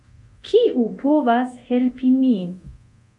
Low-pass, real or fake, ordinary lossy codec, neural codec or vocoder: 10.8 kHz; fake; MP3, 64 kbps; codec, 24 kHz, 0.9 kbps, DualCodec